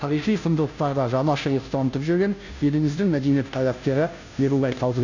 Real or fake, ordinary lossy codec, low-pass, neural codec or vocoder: fake; none; 7.2 kHz; codec, 16 kHz, 0.5 kbps, FunCodec, trained on Chinese and English, 25 frames a second